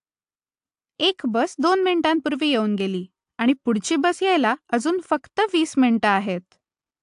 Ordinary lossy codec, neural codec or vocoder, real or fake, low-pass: AAC, 64 kbps; none; real; 10.8 kHz